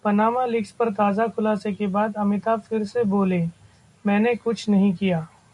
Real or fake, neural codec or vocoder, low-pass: real; none; 10.8 kHz